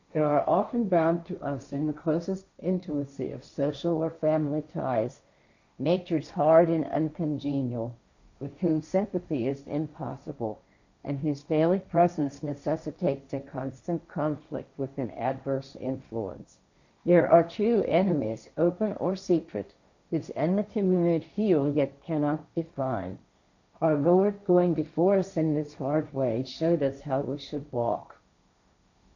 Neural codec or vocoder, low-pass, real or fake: codec, 16 kHz, 1.1 kbps, Voila-Tokenizer; 7.2 kHz; fake